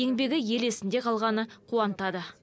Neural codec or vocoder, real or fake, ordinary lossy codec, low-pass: none; real; none; none